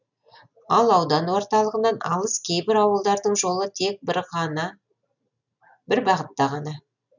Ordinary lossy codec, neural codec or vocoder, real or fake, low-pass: none; none; real; 7.2 kHz